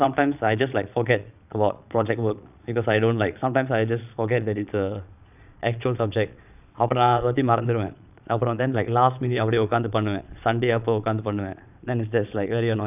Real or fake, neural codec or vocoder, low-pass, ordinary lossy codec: fake; vocoder, 22.05 kHz, 80 mel bands, Vocos; 3.6 kHz; none